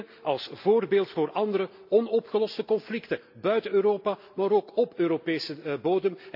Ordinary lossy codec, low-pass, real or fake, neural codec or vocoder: AAC, 48 kbps; 5.4 kHz; real; none